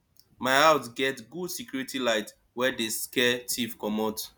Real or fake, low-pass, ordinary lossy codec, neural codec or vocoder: real; none; none; none